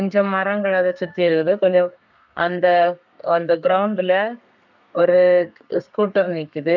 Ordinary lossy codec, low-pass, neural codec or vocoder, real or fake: none; 7.2 kHz; codec, 32 kHz, 1.9 kbps, SNAC; fake